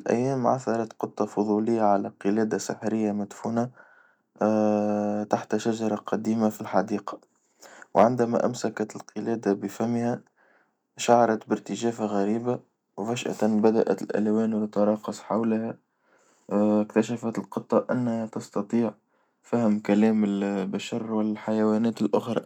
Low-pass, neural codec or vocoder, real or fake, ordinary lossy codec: 19.8 kHz; none; real; none